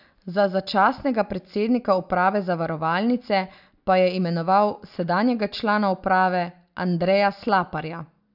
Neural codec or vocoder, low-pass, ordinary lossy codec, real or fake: none; 5.4 kHz; none; real